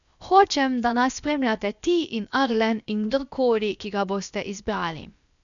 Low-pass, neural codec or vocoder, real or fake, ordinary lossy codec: 7.2 kHz; codec, 16 kHz, about 1 kbps, DyCAST, with the encoder's durations; fake; none